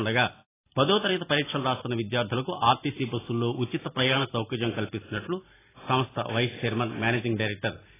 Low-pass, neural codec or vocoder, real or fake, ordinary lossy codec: 3.6 kHz; none; real; AAC, 16 kbps